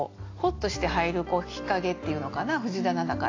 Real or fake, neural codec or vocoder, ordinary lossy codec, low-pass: real; none; AAC, 32 kbps; 7.2 kHz